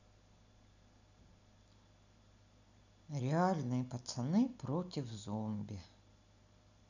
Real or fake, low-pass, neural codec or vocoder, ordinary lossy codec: real; 7.2 kHz; none; none